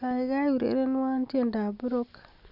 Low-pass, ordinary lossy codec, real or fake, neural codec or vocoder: 5.4 kHz; none; real; none